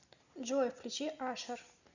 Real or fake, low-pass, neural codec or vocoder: real; 7.2 kHz; none